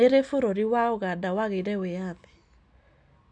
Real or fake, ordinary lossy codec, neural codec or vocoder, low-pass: real; none; none; 9.9 kHz